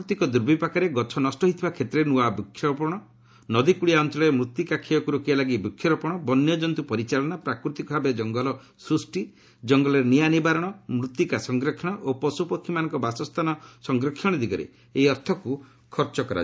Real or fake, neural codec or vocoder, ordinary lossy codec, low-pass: real; none; none; none